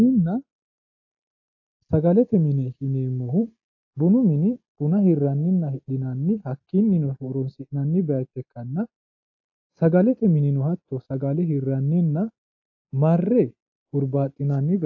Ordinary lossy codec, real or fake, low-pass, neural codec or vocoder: AAC, 48 kbps; real; 7.2 kHz; none